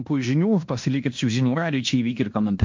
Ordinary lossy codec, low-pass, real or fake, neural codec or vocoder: MP3, 48 kbps; 7.2 kHz; fake; codec, 16 kHz in and 24 kHz out, 0.9 kbps, LongCat-Audio-Codec, fine tuned four codebook decoder